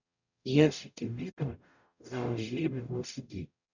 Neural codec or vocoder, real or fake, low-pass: codec, 44.1 kHz, 0.9 kbps, DAC; fake; 7.2 kHz